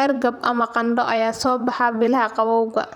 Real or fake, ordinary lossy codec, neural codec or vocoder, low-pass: fake; none; vocoder, 44.1 kHz, 128 mel bands, Pupu-Vocoder; 19.8 kHz